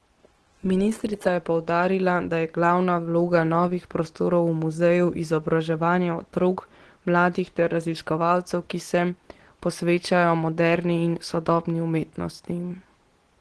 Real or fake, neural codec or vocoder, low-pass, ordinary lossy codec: real; none; 10.8 kHz; Opus, 16 kbps